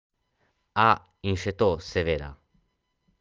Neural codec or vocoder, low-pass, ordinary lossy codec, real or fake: none; 7.2 kHz; Opus, 24 kbps; real